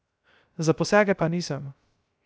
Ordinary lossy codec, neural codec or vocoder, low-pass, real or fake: none; codec, 16 kHz, 0.3 kbps, FocalCodec; none; fake